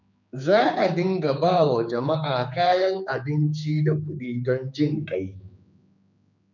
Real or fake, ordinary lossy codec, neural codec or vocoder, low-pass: fake; none; codec, 16 kHz, 4 kbps, X-Codec, HuBERT features, trained on general audio; 7.2 kHz